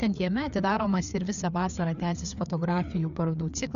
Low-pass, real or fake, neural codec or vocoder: 7.2 kHz; fake; codec, 16 kHz, 4 kbps, FreqCodec, larger model